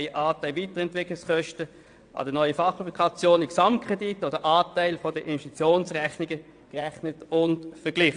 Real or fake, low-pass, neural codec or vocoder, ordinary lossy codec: fake; 9.9 kHz; vocoder, 22.05 kHz, 80 mel bands, WaveNeXt; AAC, 64 kbps